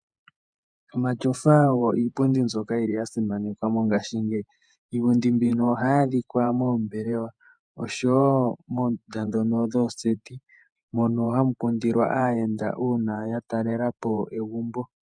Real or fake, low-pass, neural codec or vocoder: fake; 9.9 kHz; vocoder, 48 kHz, 128 mel bands, Vocos